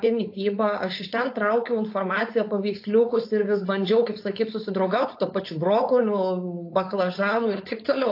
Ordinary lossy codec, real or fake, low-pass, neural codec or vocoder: AAC, 32 kbps; fake; 5.4 kHz; codec, 16 kHz, 4.8 kbps, FACodec